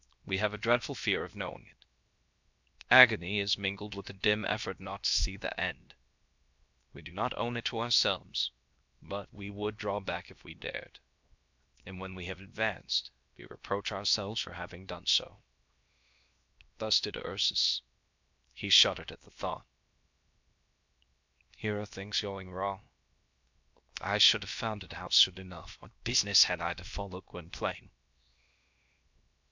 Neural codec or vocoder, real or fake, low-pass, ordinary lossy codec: codec, 16 kHz, 0.7 kbps, FocalCodec; fake; 7.2 kHz; MP3, 64 kbps